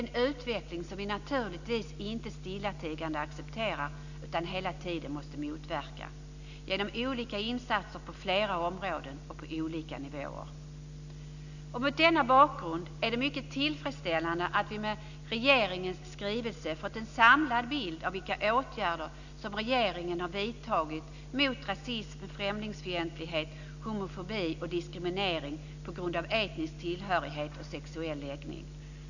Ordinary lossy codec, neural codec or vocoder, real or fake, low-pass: none; none; real; 7.2 kHz